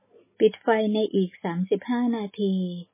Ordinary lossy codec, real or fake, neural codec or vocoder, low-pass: MP3, 16 kbps; real; none; 3.6 kHz